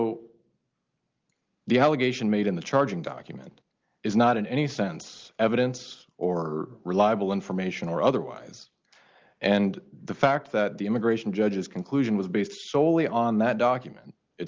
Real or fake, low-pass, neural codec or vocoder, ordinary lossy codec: real; 7.2 kHz; none; Opus, 32 kbps